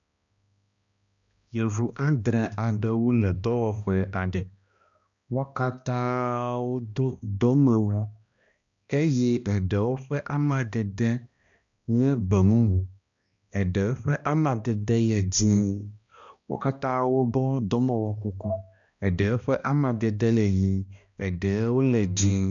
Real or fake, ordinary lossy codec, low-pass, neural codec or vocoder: fake; MP3, 64 kbps; 7.2 kHz; codec, 16 kHz, 1 kbps, X-Codec, HuBERT features, trained on balanced general audio